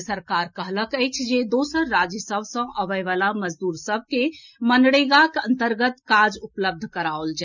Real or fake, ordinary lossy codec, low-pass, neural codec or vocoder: real; none; 7.2 kHz; none